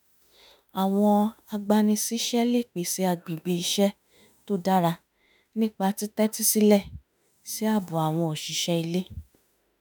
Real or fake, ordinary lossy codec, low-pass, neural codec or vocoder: fake; none; none; autoencoder, 48 kHz, 32 numbers a frame, DAC-VAE, trained on Japanese speech